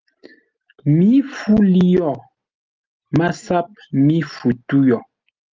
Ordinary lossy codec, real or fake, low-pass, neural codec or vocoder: Opus, 32 kbps; real; 7.2 kHz; none